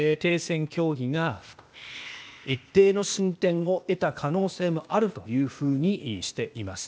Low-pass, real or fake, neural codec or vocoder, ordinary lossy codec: none; fake; codec, 16 kHz, 0.8 kbps, ZipCodec; none